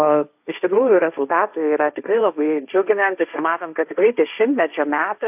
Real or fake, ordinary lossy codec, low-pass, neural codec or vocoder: fake; AAC, 32 kbps; 3.6 kHz; codec, 16 kHz, 1.1 kbps, Voila-Tokenizer